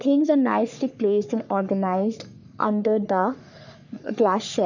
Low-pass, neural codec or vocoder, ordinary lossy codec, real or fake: 7.2 kHz; codec, 44.1 kHz, 3.4 kbps, Pupu-Codec; none; fake